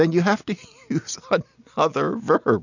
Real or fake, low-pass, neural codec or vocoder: real; 7.2 kHz; none